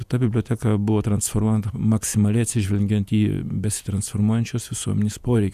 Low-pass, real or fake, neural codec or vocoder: 14.4 kHz; fake; vocoder, 48 kHz, 128 mel bands, Vocos